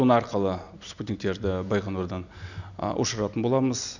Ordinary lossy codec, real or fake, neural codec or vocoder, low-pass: none; real; none; 7.2 kHz